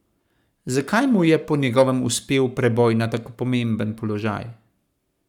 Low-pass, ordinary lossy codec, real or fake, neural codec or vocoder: 19.8 kHz; none; fake; codec, 44.1 kHz, 7.8 kbps, Pupu-Codec